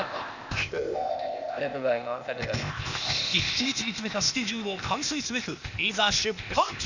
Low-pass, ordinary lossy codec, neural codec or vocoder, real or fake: 7.2 kHz; none; codec, 16 kHz, 0.8 kbps, ZipCodec; fake